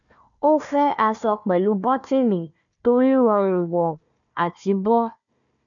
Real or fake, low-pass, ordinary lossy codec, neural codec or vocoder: fake; 7.2 kHz; none; codec, 16 kHz, 1 kbps, FunCodec, trained on Chinese and English, 50 frames a second